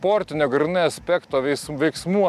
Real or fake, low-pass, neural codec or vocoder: real; 14.4 kHz; none